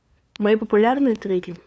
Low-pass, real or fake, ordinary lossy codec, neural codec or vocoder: none; fake; none; codec, 16 kHz, 2 kbps, FunCodec, trained on LibriTTS, 25 frames a second